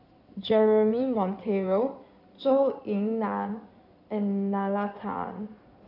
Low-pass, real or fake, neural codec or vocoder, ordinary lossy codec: 5.4 kHz; fake; codec, 16 kHz in and 24 kHz out, 2.2 kbps, FireRedTTS-2 codec; none